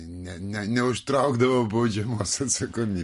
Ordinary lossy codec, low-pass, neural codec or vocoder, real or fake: MP3, 48 kbps; 14.4 kHz; none; real